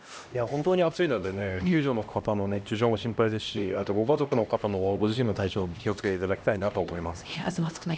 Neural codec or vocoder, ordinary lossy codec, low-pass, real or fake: codec, 16 kHz, 1 kbps, X-Codec, HuBERT features, trained on LibriSpeech; none; none; fake